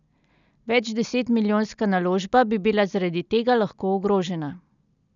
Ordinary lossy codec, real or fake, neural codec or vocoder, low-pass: none; real; none; 7.2 kHz